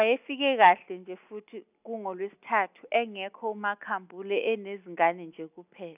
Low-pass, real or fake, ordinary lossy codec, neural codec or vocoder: 3.6 kHz; real; none; none